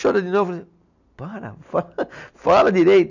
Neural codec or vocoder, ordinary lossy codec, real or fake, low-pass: none; none; real; 7.2 kHz